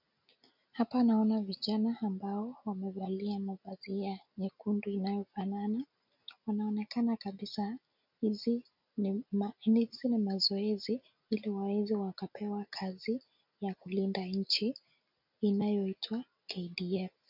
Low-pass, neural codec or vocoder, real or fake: 5.4 kHz; none; real